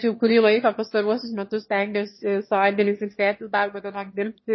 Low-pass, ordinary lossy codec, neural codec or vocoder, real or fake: 7.2 kHz; MP3, 24 kbps; autoencoder, 22.05 kHz, a latent of 192 numbers a frame, VITS, trained on one speaker; fake